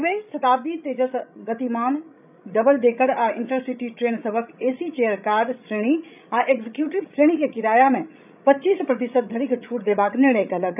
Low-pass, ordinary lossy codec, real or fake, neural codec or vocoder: 3.6 kHz; none; fake; codec, 16 kHz, 16 kbps, FreqCodec, larger model